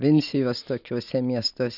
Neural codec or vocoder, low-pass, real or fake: none; 5.4 kHz; real